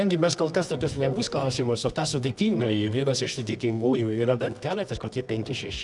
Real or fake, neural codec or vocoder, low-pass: fake; codec, 24 kHz, 0.9 kbps, WavTokenizer, medium music audio release; 10.8 kHz